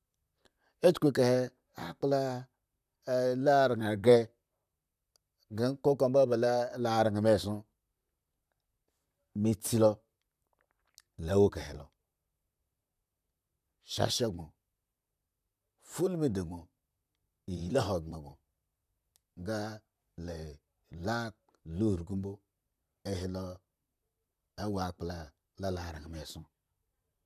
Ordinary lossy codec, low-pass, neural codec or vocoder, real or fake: none; 14.4 kHz; vocoder, 44.1 kHz, 128 mel bands, Pupu-Vocoder; fake